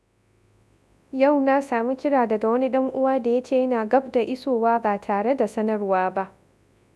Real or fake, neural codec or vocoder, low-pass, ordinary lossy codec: fake; codec, 24 kHz, 0.9 kbps, WavTokenizer, large speech release; none; none